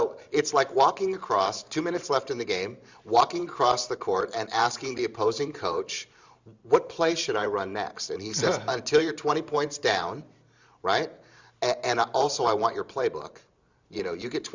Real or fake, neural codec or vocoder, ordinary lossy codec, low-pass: fake; vocoder, 44.1 kHz, 128 mel bands every 512 samples, BigVGAN v2; Opus, 64 kbps; 7.2 kHz